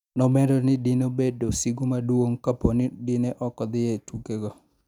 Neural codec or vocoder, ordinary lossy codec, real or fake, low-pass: none; none; real; 19.8 kHz